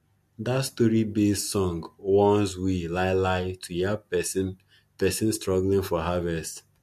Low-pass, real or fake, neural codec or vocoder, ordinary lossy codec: 14.4 kHz; real; none; MP3, 64 kbps